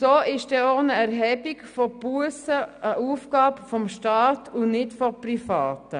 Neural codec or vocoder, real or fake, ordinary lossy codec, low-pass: none; real; none; 9.9 kHz